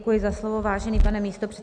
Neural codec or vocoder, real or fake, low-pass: none; real; 9.9 kHz